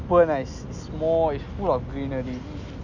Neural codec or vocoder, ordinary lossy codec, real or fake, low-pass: none; none; real; 7.2 kHz